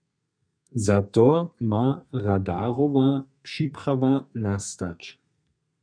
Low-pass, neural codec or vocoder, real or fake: 9.9 kHz; codec, 32 kHz, 1.9 kbps, SNAC; fake